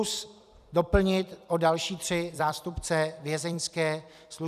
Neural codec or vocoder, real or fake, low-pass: vocoder, 44.1 kHz, 128 mel bands every 256 samples, BigVGAN v2; fake; 14.4 kHz